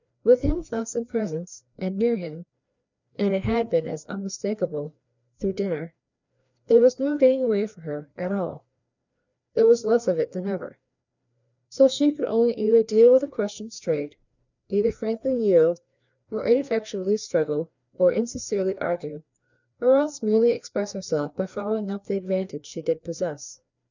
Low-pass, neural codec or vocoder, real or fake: 7.2 kHz; codec, 16 kHz, 2 kbps, FreqCodec, larger model; fake